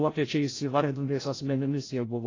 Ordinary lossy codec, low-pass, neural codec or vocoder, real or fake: AAC, 32 kbps; 7.2 kHz; codec, 16 kHz, 0.5 kbps, FreqCodec, larger model; fake